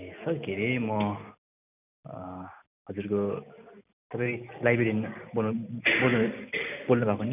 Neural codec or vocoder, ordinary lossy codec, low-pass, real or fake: none; none; 3.6 kHz; real